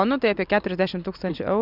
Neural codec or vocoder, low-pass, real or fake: none; 5.4 kHz; real